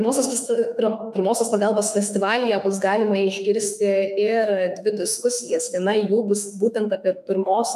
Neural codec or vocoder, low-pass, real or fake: autoencoder, 48 kHz, 32 numbers a frame, DAC-VAE, trained on Japanese speech; 14.4 kHz; fake